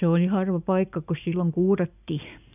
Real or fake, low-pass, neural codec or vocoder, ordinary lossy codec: real; 3.6 kHz; none; none